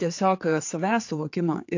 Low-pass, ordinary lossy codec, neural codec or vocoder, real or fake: 7.2 kHz; AAC, 48 kbps; codec, 16 kHz, 4 kbps, X-Codec, HuBERT features, trained on general audio; fake